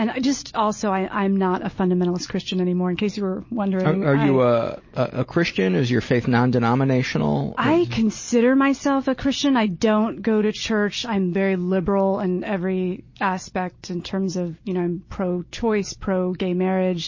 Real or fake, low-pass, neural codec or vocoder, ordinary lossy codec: real; 7.2 kHz; none; MP3, 32 kbps